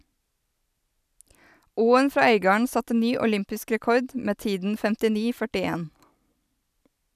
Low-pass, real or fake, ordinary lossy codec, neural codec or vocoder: 14.4 kHz; real; none; none